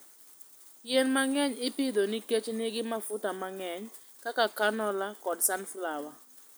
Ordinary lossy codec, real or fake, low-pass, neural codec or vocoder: none; real; none; none